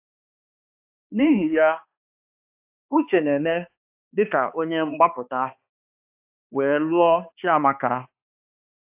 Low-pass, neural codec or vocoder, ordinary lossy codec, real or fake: 3.6 kHz; codec, 16 kHz, 2 kbps, X-Codec, HuBERT features, trained on balanced general audio; AAC, 32 kbps; fake